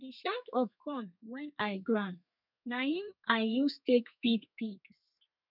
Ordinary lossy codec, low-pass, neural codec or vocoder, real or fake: none; 5.4 kHz; codec, 32 kHz, 1.9 kbps, SNAC; fake